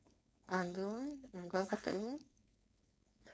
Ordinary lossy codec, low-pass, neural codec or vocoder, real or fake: none; none; codec, 16 kHz, 4.8 kbps, FACodec; fake